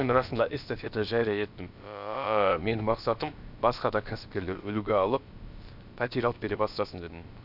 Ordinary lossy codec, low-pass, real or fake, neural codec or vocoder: none; 5.4 kHz; fake; codec, 16 kHz, about 1 kbps, DyCAST, with the encoder's durations